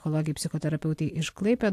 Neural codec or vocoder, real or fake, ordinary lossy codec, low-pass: none; real; AAC, 64 kbps; 14.4 kHz